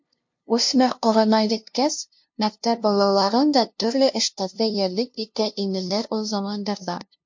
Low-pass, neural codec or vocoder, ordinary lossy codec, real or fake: 7.2 kHz; codec, 16 kHz, 0.5 kbps, FunCodec, trained on LibriTTS, 25 frames a second; MP3, 48 kbps; fake